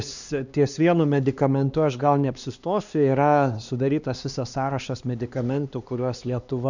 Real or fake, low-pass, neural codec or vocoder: fake; 7.2 kHz; codec, 16 kHz, 2 kbps, X-Codec, HuBERT features, trained on LibriSpeech